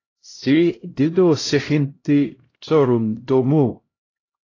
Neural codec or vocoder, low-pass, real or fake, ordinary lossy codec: codec, 16 kHz, 0.5 kbps, X-Codec, HuBERT features, trained on LibriSpeech; 7.2 kHz; fake; AAC, 32 kbps